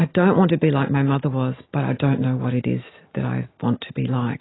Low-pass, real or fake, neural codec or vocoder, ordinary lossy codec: 7.2 kHz; real; none; AAC, 16 kbps